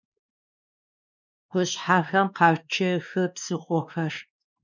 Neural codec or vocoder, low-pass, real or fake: codec, 16 kHz, 2 kbps, X-Codec, WavLM features, trained on Multilingual LibriSpeech; 7.2 kHz; fake